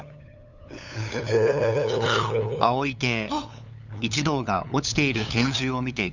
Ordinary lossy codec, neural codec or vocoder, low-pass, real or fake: none; codec, 16 kHz, 8 kbps, FunCodec, trained on LibriTTS, 25 frames a second; 7.2 kHz; fake